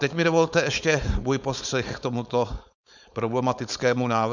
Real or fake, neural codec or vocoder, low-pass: fake; codec, 16 kHz, 4.8 kbps, FACodec; 7.2 kHz